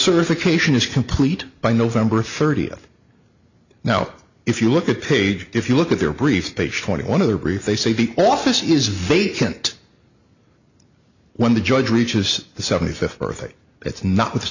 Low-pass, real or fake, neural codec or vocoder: 7.2 kHz; real; none